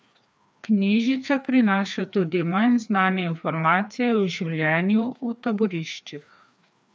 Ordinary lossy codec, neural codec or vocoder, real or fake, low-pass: none; codec, 16 kHz, 2 kbps, FreqCodec, larger model; fake; none